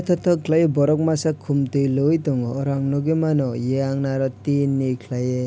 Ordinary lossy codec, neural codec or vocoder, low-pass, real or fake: none; none; none; real